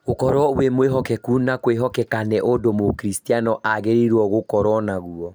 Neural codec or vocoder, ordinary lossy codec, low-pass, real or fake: none; none; none; real